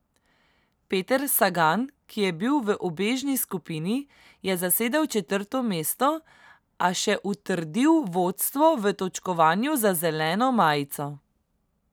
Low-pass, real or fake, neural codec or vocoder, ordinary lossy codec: none; real; none; none